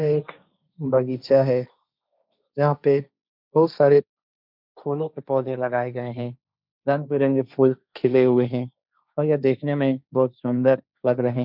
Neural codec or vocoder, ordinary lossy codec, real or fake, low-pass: codec, 16 kHz, 1.1 kbps, Voila-Tokenizer; none; fake; 5.4 kHz